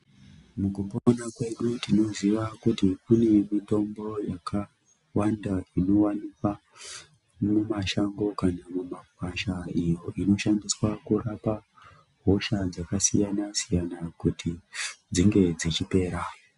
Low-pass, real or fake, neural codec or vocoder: 10.8 kHz; real; none